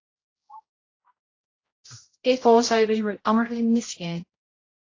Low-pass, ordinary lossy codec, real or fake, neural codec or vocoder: 7.2 kHz; AAC, 32 kbps; fake; codec, 16 kHz, 0.5 kbps, X-Codec, HuBERT features, trained on general audio